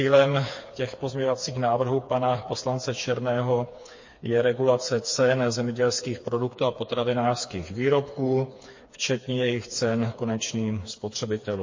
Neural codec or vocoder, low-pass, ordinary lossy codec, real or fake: codec, 16 kHz, 4 kbps, FreqCodec, smaller model; 7.2 kHz; MP3, 32 kbps; fake